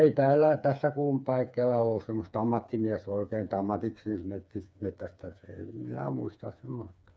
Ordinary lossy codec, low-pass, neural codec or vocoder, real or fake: none; none; codec, 16 kHz, 4 kbps, FreqCodec, smaller model; fake